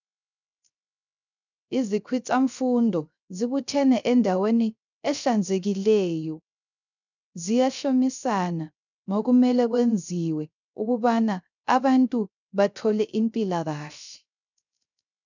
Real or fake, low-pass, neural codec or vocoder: fake; 7.2 kHz; codec, 16 kHz, 0.3 kbps, FocalCodec